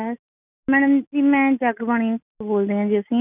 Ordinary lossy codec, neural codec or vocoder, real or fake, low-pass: none; none; real; 3.6 kHz